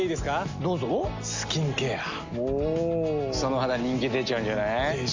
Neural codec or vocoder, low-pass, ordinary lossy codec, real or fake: none; 7.2 kHz; MP3, 64 kbps; real